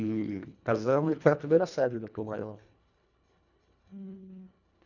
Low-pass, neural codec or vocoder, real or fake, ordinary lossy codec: 7.2 kHz; codec, 24 kHz, 1.5 kbps, HILCodec; fake; none